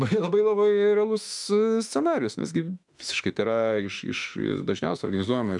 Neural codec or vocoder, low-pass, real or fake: autoencoder, 48 kHz, 32 numbers a frame, DAC-VAE, trained on Japanese speech; 10.8 kHz; fake